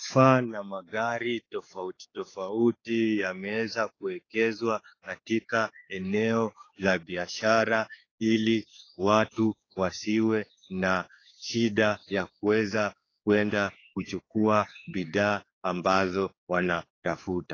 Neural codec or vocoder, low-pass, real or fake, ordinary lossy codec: codec, 16 kHz, 4 kbps, X-Codec, HuBERT features, trained on general audio; 7.2 kHz; fake; AAC, 32 kbps